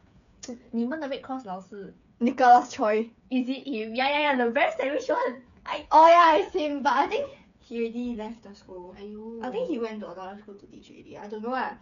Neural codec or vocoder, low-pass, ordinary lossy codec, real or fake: codec, 16 kHz, 8 kbps, FreqCodec, smaller model; 7.2 kHz; none; fake